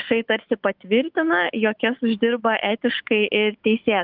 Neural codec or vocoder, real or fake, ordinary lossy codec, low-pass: autoencoder, 48 kHz, 128 numbers a frame, DAC-VAE, trained on Japanese speech; fake; Opus, 32 kbps; 5.4 kHz